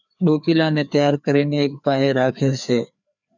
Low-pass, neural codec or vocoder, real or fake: 7.2 kHz; codec, 16 kHz, 2 kbps, FreqCodec, larger model; fake